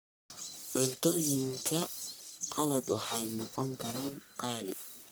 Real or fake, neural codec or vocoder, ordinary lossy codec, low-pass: fake; codec, 44.1 kHz, 1.7 kbps, Pupu-Codec; none; none